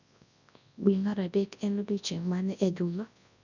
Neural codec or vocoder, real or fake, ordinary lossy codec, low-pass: codec, 24 kHz, 0.9 kbps, WavTokenizer, large speech release; fake; none; 7.2 kHz